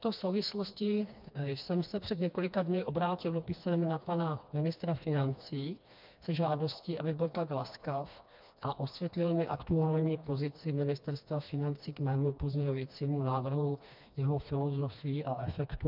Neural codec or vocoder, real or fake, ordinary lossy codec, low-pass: codec, 16 kHz, 2 kbps, FreqCodec, smaller model; fake; MP3, 48 kbps; 5.4 kHz